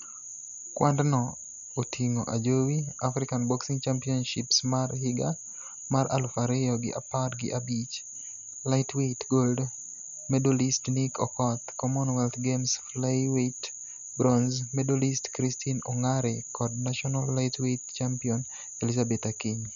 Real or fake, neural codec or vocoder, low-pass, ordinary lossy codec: real; none; 7.2 kHz; none